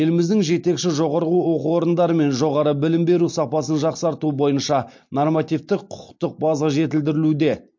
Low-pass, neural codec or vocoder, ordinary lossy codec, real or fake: 7.2 kHz; none; MP3, 48 kbps; real